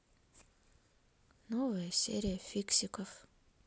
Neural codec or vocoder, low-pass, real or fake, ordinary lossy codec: none; none; real; none